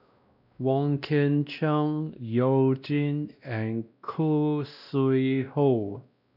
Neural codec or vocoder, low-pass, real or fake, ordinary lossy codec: codec, 16 kHz, 1 kbps, X-Codec, WavLM features, trained on Multilingual LibriSpeech; 5.4 kHz; fake; none